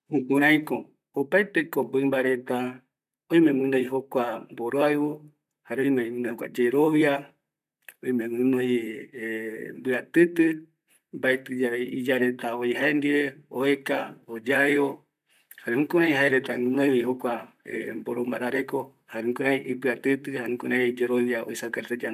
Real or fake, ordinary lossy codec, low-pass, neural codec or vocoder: fake; none; 14.4 kHz; vocoder, 44.1 kHz, 128 mel bands, Pupu-Vocoder